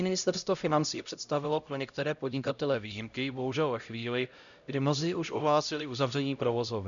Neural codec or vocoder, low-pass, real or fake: codec, 16 kHz, 0.5 kbps, X-Codec, HuBERT features, trained on LibriSpeech; 7.2 kHz; fake